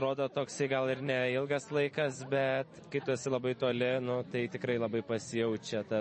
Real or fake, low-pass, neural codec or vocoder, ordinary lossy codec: fake; 10.8 kHz; vocoder, 44.1 kHz, 128 mel bands every 256 samples, BigVGAN v2; MP3, 32 kbps